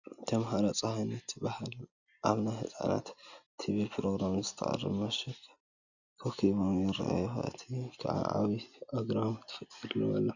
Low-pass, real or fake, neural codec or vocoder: 7.2 kHz; real; none